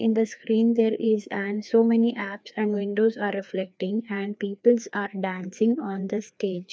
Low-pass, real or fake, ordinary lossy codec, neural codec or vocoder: none; fake; none; codec, 16 kHz, 2 kbps, FreqCodec, larger model